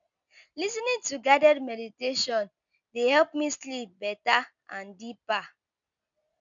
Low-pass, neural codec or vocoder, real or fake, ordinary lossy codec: 7.2 kHz; none; real; none